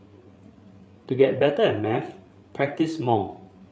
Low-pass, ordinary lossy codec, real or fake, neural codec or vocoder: none; none; fake; codec, 16 kHz, 8 kbps, FreqCodec, larger model